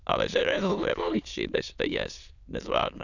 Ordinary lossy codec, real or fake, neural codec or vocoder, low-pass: none; fake; autoencoder, 22.05 kHz, a latent of 192 numbers a frame, VITS, trained on many speakers; 7.2 kHz